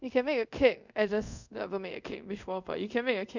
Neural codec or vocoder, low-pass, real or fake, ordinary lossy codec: codec, 24 kHz, 0.5 kbps, DualCodec; 7.2 kHz; fake; none